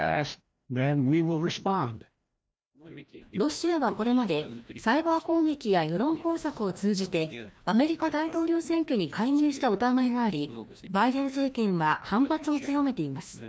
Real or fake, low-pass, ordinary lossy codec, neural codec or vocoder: fake; none; none; codec, 16 kHz, 1 kbps, FreqCodec, larger model